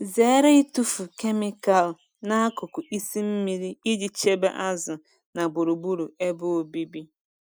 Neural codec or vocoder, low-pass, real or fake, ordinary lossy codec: none; none; real; none